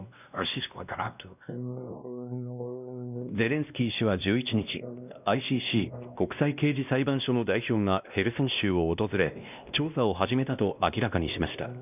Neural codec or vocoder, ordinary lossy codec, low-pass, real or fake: codec, 16 kHz, 1 kbps, X-Codec, WavLM features, trained on Multilingual LibriSpeech; none; 3.6 kHz; fake